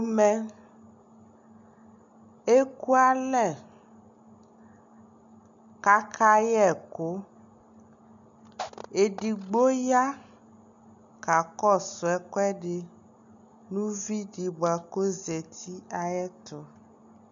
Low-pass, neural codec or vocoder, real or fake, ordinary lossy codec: 7.2 kHz; none; real; MP3, 64 kbps